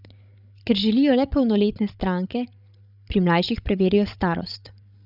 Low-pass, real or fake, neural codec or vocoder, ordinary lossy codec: 5.4 kHz; fake; codec, 16 kHz, 16 kbps, FreqCodec, larger model; none